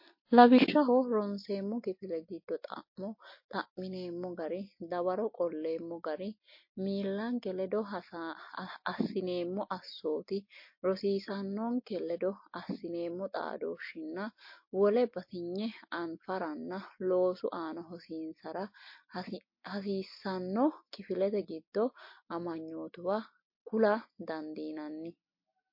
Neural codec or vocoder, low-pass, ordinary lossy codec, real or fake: none; 5.4 kHz; MP3, 32 kbps; real